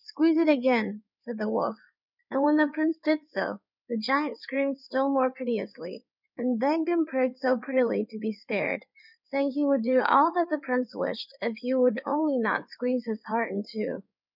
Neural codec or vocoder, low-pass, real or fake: codec, 16 kHz in and 24 kHz out, 2.2 kbps, FireRedTTS-2 codec; 5.4 kHz; fake